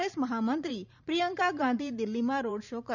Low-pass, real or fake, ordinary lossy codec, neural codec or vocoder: 7.2 kHz; fake; none; vocoder, 44.1 kHz, 128 mel bands every 512 samples, BigVGAN v2